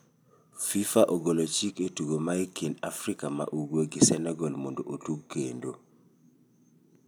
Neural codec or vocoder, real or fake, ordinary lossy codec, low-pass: none; real; none; none